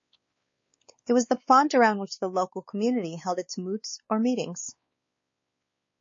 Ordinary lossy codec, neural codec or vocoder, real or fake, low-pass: MP3, 32 kbps; codec, 16 kHz, 4 kbps, X-Codec, WavLM features, trained on Multilingual LibriSpeech; fake; 7.2 kHz